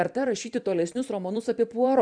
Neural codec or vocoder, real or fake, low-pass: vocoder, 44.1 kHz, 128 mel bands every 256 samples, BigVGAN v2; fake; 9.9 kHz